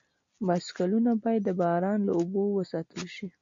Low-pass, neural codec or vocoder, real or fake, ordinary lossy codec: 7.2 kHz; none; real; MP3, 48 kbps